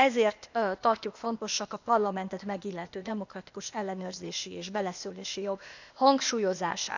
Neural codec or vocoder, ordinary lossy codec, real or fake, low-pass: codec, 16 kHz, 0.8 kbps, ZipCodec; none; fake; 7.2 kHz